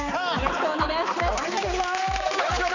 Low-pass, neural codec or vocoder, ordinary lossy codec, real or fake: 7.2 kHz; codec, 16 kHz, 4 kbps, X-Codec, HuBERT features, trained on balanced general audio; none; fake